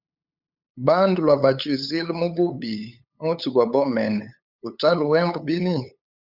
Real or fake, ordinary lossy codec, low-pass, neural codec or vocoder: fake; Opus, 64 kbps; 5.4 kHz; codec, 16 kHz, 8 kbps, FunCodec, trained on LibriTTS, 25 frames a second